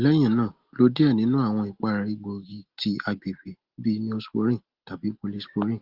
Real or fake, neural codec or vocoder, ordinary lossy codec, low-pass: real; none; Opus, 16 kbps; 5.4 kHz